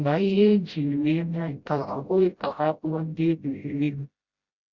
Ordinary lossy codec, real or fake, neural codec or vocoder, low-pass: Opus, 64 kbps; fake; codec, 16 kHz, 0.5 kbps, FreqCodec, smaller model; 7.2 kHz